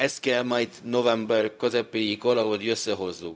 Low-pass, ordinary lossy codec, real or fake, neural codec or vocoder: none; none; fake; codec, 16 kHz, 0.4 kbps, LongCat-Audio-Codec